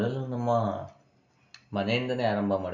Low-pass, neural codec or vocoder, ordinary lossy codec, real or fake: 7.2 kHz; none; none; real